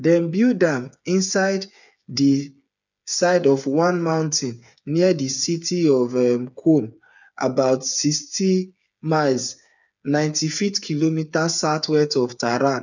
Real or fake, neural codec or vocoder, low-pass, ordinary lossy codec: fake; codec, 16 kHz, 8 kbps, FreqCodec, smaller model; 7.2 kHz; none